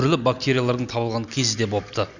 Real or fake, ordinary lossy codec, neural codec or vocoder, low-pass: real; none; none; 7.2 kHz